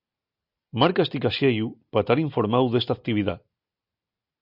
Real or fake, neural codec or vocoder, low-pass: real; none; 5.4 kHz